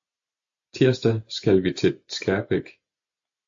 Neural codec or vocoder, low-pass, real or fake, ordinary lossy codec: none; 7.2 kHz; real; AAC, 64 kbps